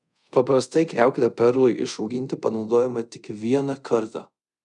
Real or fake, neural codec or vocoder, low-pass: fake; codec, 24 kHz, 0.5 kbps, DualCodec; 10.8 kHz